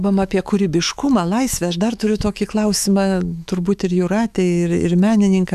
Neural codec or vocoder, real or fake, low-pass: autoencoder, 48 kHz, 128 numbers a frame, DAC-VAE, trained on Japanese speech; fake; 14.4 kHz